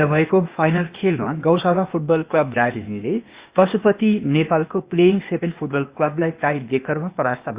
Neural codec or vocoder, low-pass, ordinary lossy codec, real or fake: codec, 16 kHz, 0.8 kbps, ZipCodec; 3.6 kHz; Opus, 64 kbps; fake